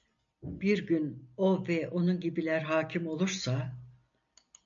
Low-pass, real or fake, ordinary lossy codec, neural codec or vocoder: 7.2 kHz; real; AAC, 64 kbps; none